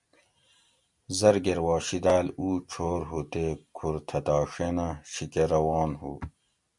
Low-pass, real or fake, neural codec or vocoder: 10.8 kHz; real; none